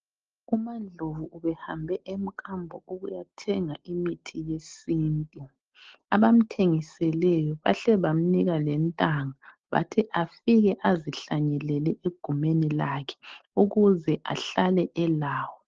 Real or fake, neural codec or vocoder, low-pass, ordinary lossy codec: real; none; 7.2 kHz; Opus, 16 kbps